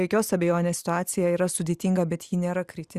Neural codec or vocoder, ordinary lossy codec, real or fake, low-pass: none; Opus, 64 kbps; real; 14.4 kHz